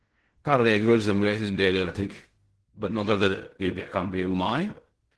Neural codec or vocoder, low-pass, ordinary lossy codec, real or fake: codec, 16 kHz in and 24 kHz out, 0.4 kbps, LongCat-Audio-Codec, fine tuned four codebook decoder; 10.8 kHz; Opus, 16 kbps; fake